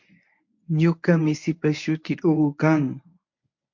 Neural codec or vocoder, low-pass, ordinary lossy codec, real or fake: codec, 24 kHz, 0.9 kbps, WavTokenizer, medium speech release version 1; 7.2 kHz; MP3, 48 kbps; fake